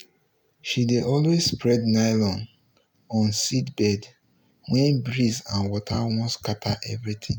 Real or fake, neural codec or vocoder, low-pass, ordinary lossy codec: real; none; none; none